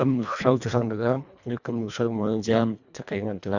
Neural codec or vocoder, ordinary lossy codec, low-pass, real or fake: codec, 24 kHz, 1.5 kbps, HILCodec; none; 7.2 kHz; fake